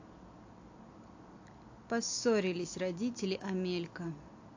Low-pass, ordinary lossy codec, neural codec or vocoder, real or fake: 7.2 kHz; AAC, 48 kbps; none; real